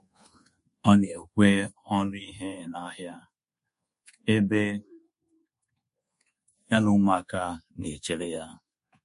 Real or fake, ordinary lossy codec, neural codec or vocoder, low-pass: fake; MP3, 48 kbps; codec, 24 kHz, 1.2 kbps, DualCodec; 10.8 kHz